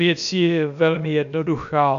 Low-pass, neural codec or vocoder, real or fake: 7.2 kHz; codec, 16 kHz, about 1 kbps, DyCAST, with the encoder's durations; fake